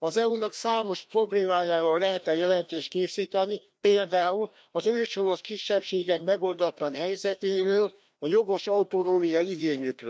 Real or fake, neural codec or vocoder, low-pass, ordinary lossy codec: fake; codec, 16 kHz, 1 kbps, FreqCodec, larger model; none; none